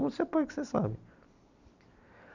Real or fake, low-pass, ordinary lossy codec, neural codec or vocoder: real; 7.2 kHz; none; none